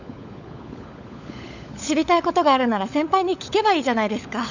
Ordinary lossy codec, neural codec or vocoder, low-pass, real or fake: none; codec, 16 kHz, 16 kbps, FunCodec, trained on LibriTTS, 50 frames a second; 7.2 kHz; fake